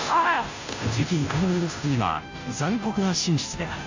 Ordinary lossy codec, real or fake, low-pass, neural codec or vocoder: AAC, 48 kbps; fake; 7.2 kHz; codec, 16 kHz, 0.5 kbps, FunCodec, trained on Chinese and English, 25 frames a second